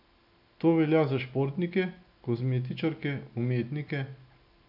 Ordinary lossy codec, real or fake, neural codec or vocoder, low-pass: none; real; none; 5.4 kHz